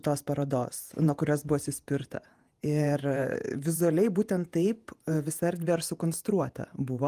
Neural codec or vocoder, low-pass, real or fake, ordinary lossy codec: vocoder, 48 kHz, 128 mel bands, Vocos; 14.4 kHz; fake; Opus, 32 kbps